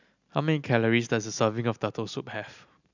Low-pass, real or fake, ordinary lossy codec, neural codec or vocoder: 7.2 kHz; real; none; none